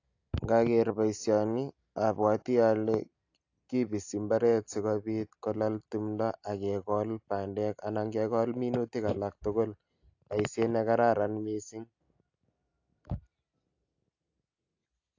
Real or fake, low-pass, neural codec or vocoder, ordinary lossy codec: real; 7.2 kHz; none; AAC, 48 kbps